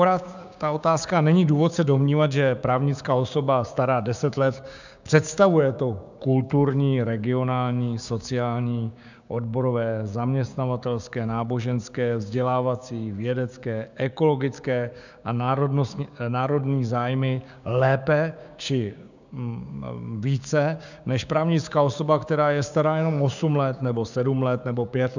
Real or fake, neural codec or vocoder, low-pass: fake; codec, 16 kHz, 6 kbps, DAC; 7.2 kHz